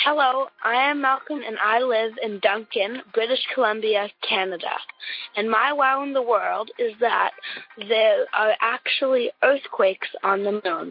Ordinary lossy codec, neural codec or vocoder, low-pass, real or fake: MP3, 32 kbps; none; 5.4 kHz; real